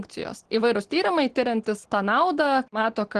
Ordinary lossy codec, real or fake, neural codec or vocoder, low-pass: Opus, 16 kbps; real; none; 9.9 kHz